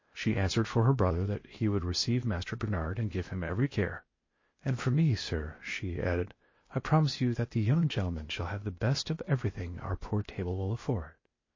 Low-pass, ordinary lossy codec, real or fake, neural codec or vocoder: 7.2 kHz; MP3, 32 kbps; fake; codec, 16 kHz, 0.8 kbps, ZipCodec